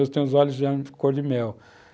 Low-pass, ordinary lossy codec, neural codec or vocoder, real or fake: none; none; none; real